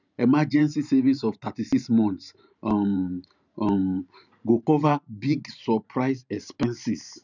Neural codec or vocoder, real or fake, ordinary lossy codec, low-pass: vocoder, 44.1 kHz, 128 mel bands every 512 samples, BigVGAN v2; fake; none; 7.2 kHz